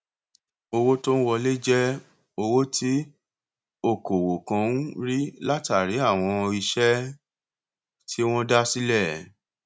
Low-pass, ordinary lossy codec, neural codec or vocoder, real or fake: none; none; none; real